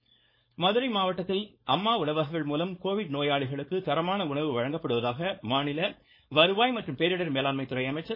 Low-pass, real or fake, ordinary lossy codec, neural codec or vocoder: 5.4 kHz; fake; MP3, 24 kbps; codec, 16 kHz, 4.8 kbps, FACodec